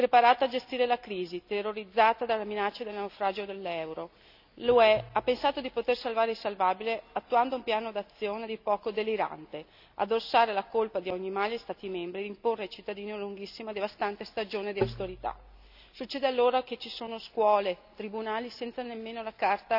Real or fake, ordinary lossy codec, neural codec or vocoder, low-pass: real; none; none; 5.4 kHz